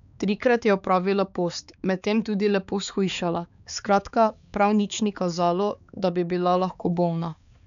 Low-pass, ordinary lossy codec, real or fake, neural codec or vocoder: 7.2 kHz; none; fake; codec, 16 kHz, 4 kbps, X-Codec, HuBERT features, trained on balanced general audio